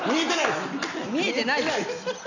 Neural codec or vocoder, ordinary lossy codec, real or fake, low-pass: none; none; real; 7.2 kHz